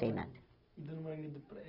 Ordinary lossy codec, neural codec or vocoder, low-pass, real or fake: Opus, 64 kbps; none; 5.4 kHz; real